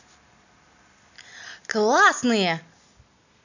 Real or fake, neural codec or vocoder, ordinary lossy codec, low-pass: real; none; none; 7.2 kHz